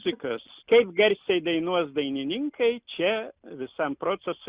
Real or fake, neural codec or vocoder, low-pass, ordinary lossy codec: real; none; 3.6 kHz; Opus, 64 kbps